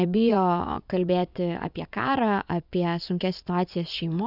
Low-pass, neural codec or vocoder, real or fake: 5.4 kHz; vocoder, 24 kHz, 100 mel bands, Vocos; fake